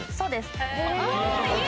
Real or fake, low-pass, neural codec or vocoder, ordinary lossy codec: real; none; none; none